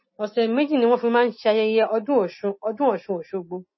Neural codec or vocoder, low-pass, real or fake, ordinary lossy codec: none; 7.2 kHz; real; MP3, 24 kbps